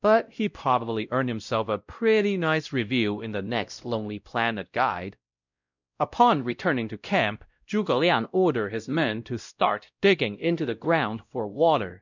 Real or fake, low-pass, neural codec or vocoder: fake; 7.2 kHz; codec, 16 kHz, 0.5 kbps, X-Codec, WavLM features, trained on Multilingual LibriSpeech